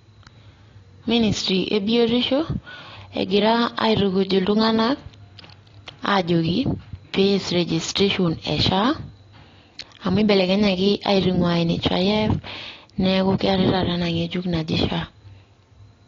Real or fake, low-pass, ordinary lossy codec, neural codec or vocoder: real; 7.2 kHz; AAC, 32 kbps; none